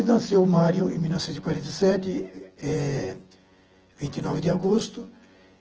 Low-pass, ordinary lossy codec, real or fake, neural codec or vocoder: 7.2 kHz; Opus, 16 kbps; fake; vocoder, 24 kHz, 100 mel bands, Vocos